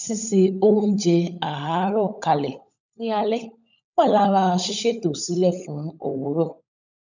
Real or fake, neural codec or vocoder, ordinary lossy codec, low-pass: fake; codec, 16 kHz, 16 kbps, FunCodec, trained on LibriTTS, 50 frames a second; none; 7.2 kHz